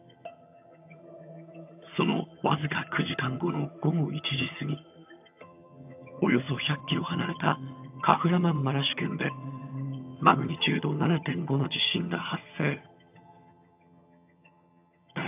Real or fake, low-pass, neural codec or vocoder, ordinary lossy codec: fake; 3.6 kHz; vocoder, 22.05 kHz, 80 mel bands, HiFi-GAN; AAC, 32 kbps